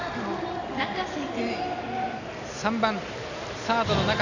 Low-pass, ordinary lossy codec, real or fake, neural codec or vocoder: 7.2 kHz; none; real; none